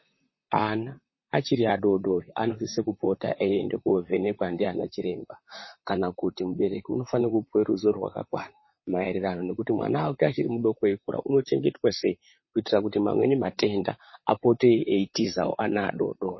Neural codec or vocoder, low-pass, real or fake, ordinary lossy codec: vocoder, 44.1 kHz, 128 mel bands, Pupu-Vocoder; 7.2 kHz; fake; MP3, 24 kbps